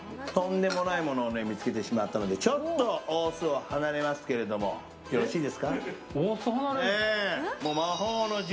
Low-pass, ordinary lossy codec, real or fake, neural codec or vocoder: none; none; real; none